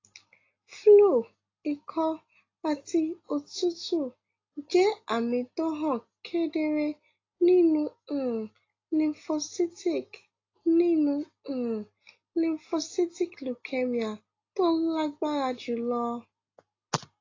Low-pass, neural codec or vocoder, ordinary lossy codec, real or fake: 7.2 kHz; none; AAC, 32 kbps; real